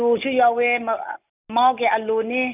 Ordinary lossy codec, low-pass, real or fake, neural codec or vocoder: none; 3.6 kHz; real; none